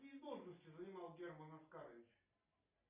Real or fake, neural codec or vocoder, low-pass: real; none; 3.6 kHz